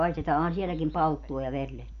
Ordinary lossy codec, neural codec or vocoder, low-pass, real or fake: none; none; 7.2 kHz; real